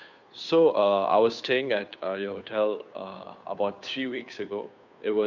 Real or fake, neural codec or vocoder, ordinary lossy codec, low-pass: fake; codec, 16 kHz, 2 kbps, FunCodec, trained on Chinese and English, 25 frames a second; none; 7.2 kHz